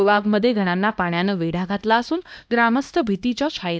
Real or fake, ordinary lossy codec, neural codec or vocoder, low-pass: fake; none; codec, 16 kHz, 1 kbps, X-Codec, HuBERT features, trained on LibriSpeech; none